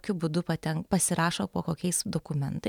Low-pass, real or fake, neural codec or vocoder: 19.8 kHz; fake; vocoder, 44.1 kHz, 128 mel bands every 512 samples, BigVGAN v2